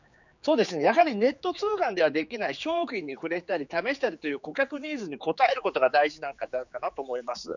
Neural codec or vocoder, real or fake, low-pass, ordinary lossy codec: codec, 16 kHz, 4 kbps, X-Codec, HuBERT features, trained on general audio; fake; 7.2 kHz; none